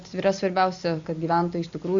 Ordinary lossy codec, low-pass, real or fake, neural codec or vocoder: Opus, 64 kbps; 7.2 kHz; real; none